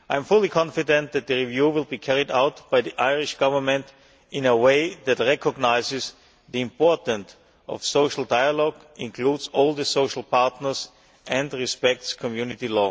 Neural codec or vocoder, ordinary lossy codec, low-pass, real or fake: none; none; none; real